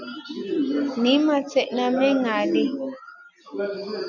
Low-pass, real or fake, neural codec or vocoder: 7.2 kHz; real; none